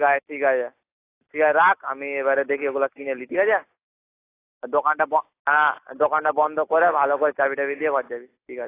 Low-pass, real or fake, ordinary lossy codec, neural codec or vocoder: 3.6 kHz; real; AAC, 24 kbps; none